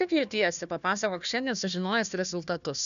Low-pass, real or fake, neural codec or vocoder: 7.2 kHz; fake; codec, 16 kHz, 1 kbps, FunCodec, trained on Chinese and English, 50 frames a second